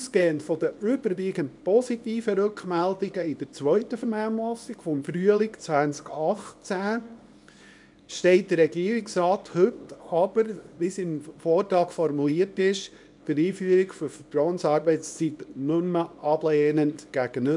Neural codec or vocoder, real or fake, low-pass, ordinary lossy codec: codec, 24 kHz, 0.9 kbps, WavTokenizer, small release; fake; 10.8 kHz; none